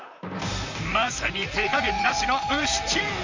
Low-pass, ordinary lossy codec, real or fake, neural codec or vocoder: 7.2 kHz; MP3, 64 kbps; fake; vocoder, 44.1 kHz, 128 mel bands, Pupu-Vocoder